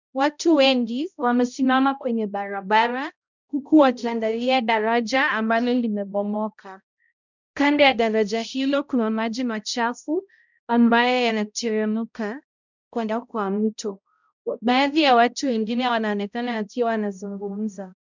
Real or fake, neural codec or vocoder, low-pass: fake; codec, 16 kHz, 0.5 kbps, X-Codec, HuBERT features, trained on balanced general audio; 7.2 kHz